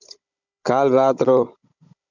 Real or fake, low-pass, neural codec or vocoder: fake; 7.2 kHz; codec, 16 kHz, 16 kbps, FunCodec, trained on Chinese and English, 50 frames a second